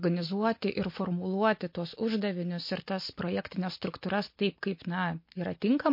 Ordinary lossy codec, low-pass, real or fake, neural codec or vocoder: MP3, 32 kbps; 5.4 kHz; real; none